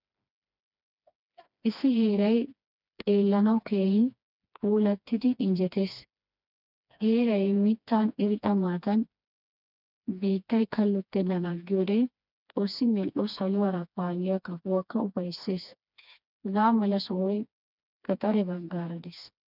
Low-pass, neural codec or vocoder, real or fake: 5.4 kHz; codec, 16 kHz, 2 kbps, FreqCodec, smaller model; fake